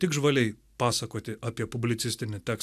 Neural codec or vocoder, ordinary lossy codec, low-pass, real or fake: none; AAC, 96 kbps; 14.4 kHz; real